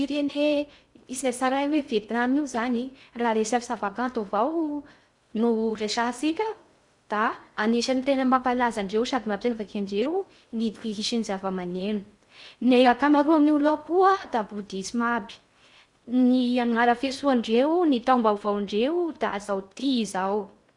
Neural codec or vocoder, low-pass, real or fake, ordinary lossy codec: codec, 16 kHz in and 24 kHz out, 0.6 kbps, FocalCodec, streaming, 2048 codes; 10.8 kHz; fake; Opus, 64 kbps